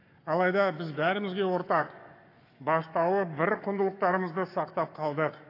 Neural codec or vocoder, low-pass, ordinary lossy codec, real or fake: codec, 44.1 kHz, 7.8 kbps, Pupu-Codec; 5.4 kHz; AAC, 32 kbps; fake